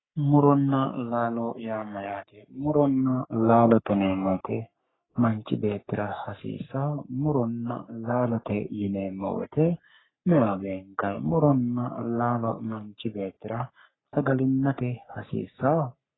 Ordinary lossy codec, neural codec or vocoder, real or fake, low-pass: AAC, 16 kbps; codec, 44.1 kHz, 3.4 kbps, Pupu-Codec; fake; 7.2 kHz